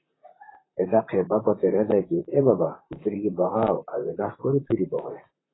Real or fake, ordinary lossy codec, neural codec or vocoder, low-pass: fake; AAC, 16 kbps; vocoder, 44.1 kHz, 128 mel bands, Pupu-Vocoder; 7.2 kHz